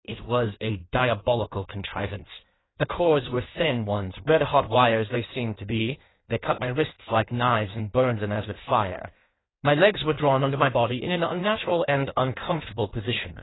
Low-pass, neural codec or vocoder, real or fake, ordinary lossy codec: 7.2 kHz; codec, 16 kHz in and 24 kHz out, 1.1 kbps, FireRedTTS-2 codec; fake; AAC, 16 kbps